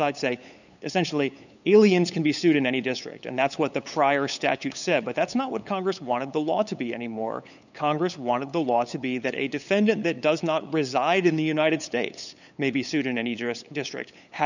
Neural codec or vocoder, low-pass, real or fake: codec, 16 kHz, 8 kbps, FunCodec, trained on LibriTTS, 25 frames a second; 7.2 kHz; fake